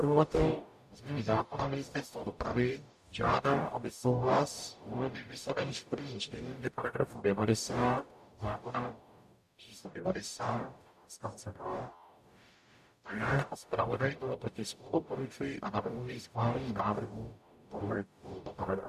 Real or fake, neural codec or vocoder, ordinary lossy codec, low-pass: fake; codec, 44.1 kHz, 0.9 kbps, DAC; AAC, 96 kbps; 14.4 kHz